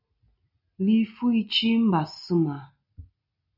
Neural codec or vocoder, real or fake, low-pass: none; real; 5.4 kHz